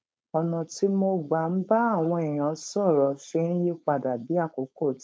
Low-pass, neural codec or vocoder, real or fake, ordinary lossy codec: none; codec, 16 kHz, 4.8 kbps, FACodec; fake; none